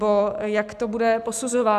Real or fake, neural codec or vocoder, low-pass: real; none; 14.4 kHz